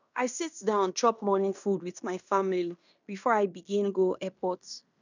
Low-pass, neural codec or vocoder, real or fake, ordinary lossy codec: 7.2 kHz; codec, 16 kHz in and 24 kHz out, 0.9 kbps, LongCat-Audio-Codec, fine tuned four codebook decoder; fake; none